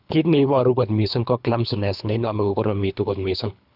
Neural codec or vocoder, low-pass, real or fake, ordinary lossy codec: codec, 24 kHz, 3 kbps, HILCodec; 5.4 kHz; fake; none